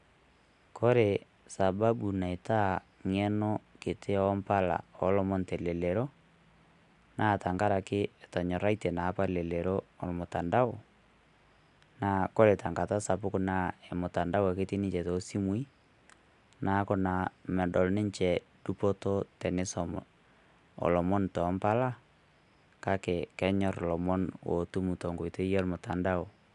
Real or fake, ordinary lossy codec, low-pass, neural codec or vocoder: real; none; 10.8 kHz; none